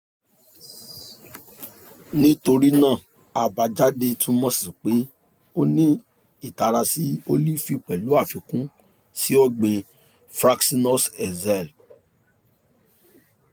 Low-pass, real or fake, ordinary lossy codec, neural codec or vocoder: none; real; none; none